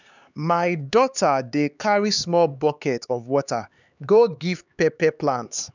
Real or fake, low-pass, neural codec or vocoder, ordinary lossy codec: fake; 7.2 kHz; codec, 16 kHz, 4 kbps, X-Codec, HuBERT features, trained on LibriSpeech; none